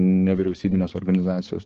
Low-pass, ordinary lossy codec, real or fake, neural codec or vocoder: 7.2 kHz; Opus, 16 kbps; fake; codec, 16 kHz, 4 kbps, X-Codec, HuBERT features, trained on balanced general audio